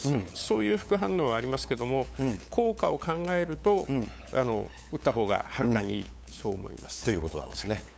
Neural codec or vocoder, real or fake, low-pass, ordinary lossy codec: codec, 16 kHz, 8 kbps, FunCodec, trained on LibriTTS, 25 frames a second; fake; none; none